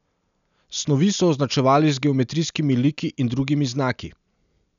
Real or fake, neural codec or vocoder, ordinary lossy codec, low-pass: real; none; none; 7.2 kHz